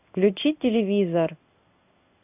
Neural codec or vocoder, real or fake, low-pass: codec, 16 kHz in and 24 kHz out, 1 kbps, XY-Tokenizer; fake; 3.6 kHz